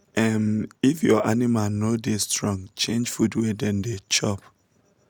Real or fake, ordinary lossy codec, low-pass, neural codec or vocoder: real; none; none; none